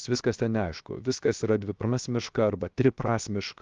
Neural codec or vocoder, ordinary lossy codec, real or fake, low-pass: codec, 16 kHz, 0.7 kbps, FocalCodec; Opus, 32 kbps; fake; 7.2 kHz